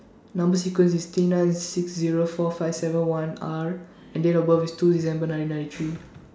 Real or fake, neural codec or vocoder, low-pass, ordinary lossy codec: real; none; none; none